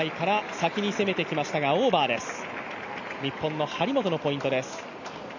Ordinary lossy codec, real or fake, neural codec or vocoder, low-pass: none; real; none; 7.2 kHz